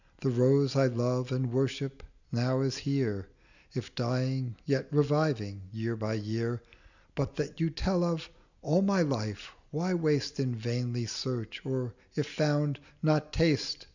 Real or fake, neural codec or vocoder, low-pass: real; none; 7.2 kHz